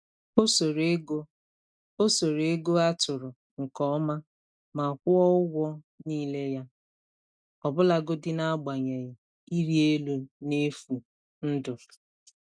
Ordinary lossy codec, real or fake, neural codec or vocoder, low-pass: none; real; none; 9.9 kHz